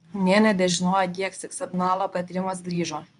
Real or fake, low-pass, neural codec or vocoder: fake; 10.8 kHz; codec, 24 kHz, 0.9 kbps, WavTokenizer, medium speech release version 2